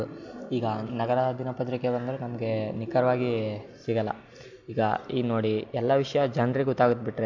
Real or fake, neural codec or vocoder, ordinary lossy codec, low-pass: real; none; AAC, 48 kbps; 7.2 kHz